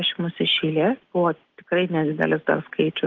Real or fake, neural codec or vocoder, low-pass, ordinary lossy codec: real; none; 7.2 kHz; Opus, 16 kbps